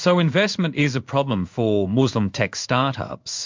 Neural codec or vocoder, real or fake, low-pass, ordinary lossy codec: codec, 16 kHz in and 24 kHz out, 1 kbps, XY-Tokenizer; fake; 7.2 kHz; MP3, 64 kbps